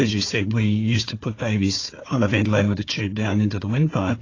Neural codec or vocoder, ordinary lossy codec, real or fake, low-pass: codec, 16 kHz, 4 kbps, FunCodec, trained on Chinese and English, 50 frames a second; AAC, 32 kbps; fake; 7.2 kHz